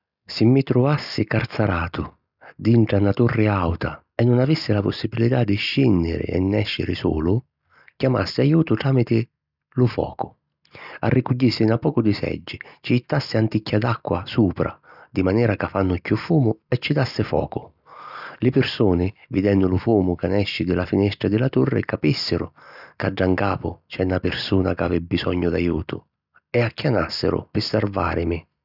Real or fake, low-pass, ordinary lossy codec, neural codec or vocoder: real; 5.4 kHz; none; none